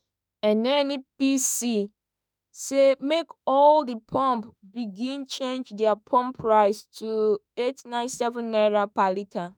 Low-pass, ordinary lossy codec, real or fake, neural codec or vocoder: none; none; fake; autoencoder, 48 kHz, 32 numbers a frame, DAC-VAE, trained on Japanese speech